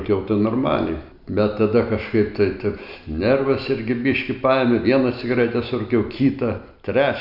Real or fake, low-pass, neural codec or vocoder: real; 5.4 kHz; none